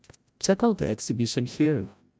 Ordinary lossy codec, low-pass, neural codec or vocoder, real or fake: none; none; codec, 16 kHz, 0.5 kbps, FreqCodec, larger model; fake